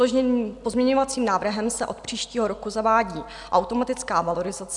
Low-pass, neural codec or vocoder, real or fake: 10.8 kHz; none; real